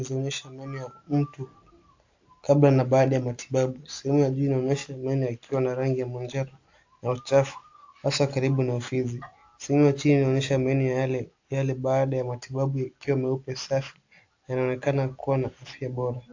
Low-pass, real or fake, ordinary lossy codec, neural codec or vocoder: 7.2 kHz; real; AAC, 48 kbps; none